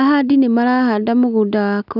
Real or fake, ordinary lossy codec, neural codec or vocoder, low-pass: real; none; none; 5.4 kHz